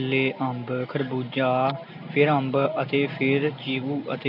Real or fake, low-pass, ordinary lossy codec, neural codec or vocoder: real; 5.4 kHz; none; none